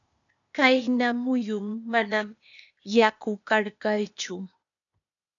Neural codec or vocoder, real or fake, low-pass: codec, 16 kHz, 0.8 kbps, ZipCodec; fake; 7.2 kHz